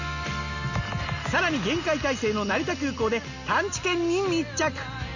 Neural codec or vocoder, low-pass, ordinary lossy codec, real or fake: none; 7.2 kHz; none; real